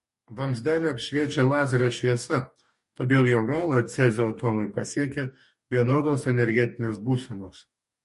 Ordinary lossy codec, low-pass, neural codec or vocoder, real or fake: MP3, 48 kbps; 14.4 kHz; codec, 44.1 kHz, 2.6 kbps, DAC; fake